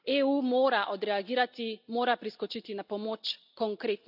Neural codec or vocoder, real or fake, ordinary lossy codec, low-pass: none; real; none; 5.4 kHz